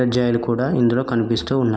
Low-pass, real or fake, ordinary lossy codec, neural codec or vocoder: none; real; none; none